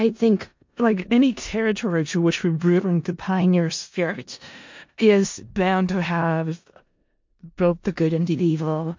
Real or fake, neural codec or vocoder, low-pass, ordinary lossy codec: fake; codec, 16 kHz in and 24 kHz out, 0.4 kbps, LongCat-Audio-Codec, four codebook decoder; 7.2 kHz; MP3, 48 kbps